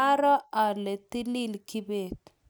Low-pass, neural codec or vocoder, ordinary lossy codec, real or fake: none; none; none; real